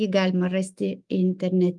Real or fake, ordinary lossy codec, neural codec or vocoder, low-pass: fake; Opus, 32 kbps; autoencoder, 48 kHz, 128 numbers a frame, DAC-VAE, trained on Japanese speech; 10.8 kHz